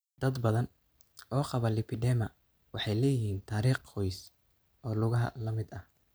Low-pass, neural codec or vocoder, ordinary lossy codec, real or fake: none; none; none; real